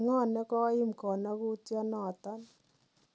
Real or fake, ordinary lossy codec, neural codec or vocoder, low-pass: real; none; none; none